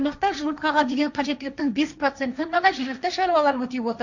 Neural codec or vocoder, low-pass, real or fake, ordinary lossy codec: codec, 16 kHz, 1.1 kbps, Voila-Tokenizer; 7.2 kHz; fake; none